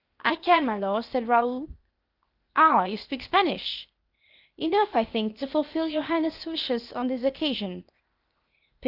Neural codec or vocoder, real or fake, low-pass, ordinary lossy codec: codec, 16 kHz, 0.8 kbps, ZipCodec; fake; 5.4 kHz; Opus, 24 kbps